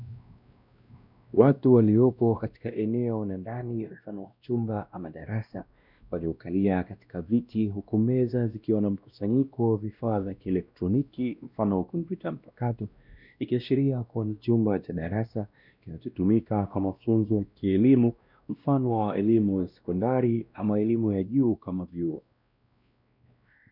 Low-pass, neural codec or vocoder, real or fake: 5.4 kHz; codec, 16 kHz, 1 kbps, X-Codec, WavLM features, trained on Multilingual LibriSpeech; fake